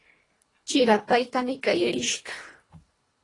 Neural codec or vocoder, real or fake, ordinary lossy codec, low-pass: codec, 24 kHz, 1.5 kbps, HILCodec; fake; AAC, 32 kbps; 10.8 kHz